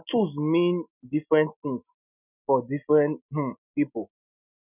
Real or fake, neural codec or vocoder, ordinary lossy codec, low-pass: real; none; none; 3.6 kHz